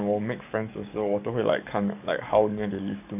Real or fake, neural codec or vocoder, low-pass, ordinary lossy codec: fake; codec, 44.1 kHz, 7.8 kbps, DAC; 3.6 kHz; none